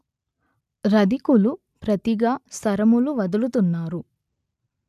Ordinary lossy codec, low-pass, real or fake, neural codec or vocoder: none; 14.4 kHz; real; none